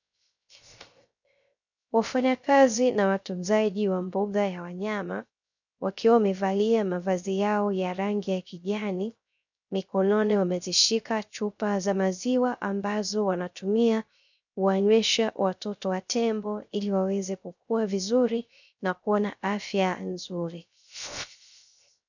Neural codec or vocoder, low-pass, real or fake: codec, 16 kHz, 0.3 kbps, FocalCodec; 7.2 kHz; fake